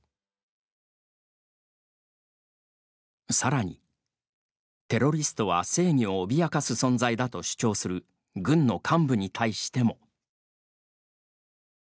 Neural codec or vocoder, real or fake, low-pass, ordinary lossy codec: none; real; none; none